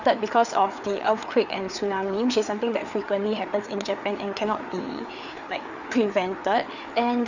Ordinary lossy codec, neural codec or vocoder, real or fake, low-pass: none; codec, 16 kHz, 4 kbps, FreqCodec, larger model; fake; 7.2 kHz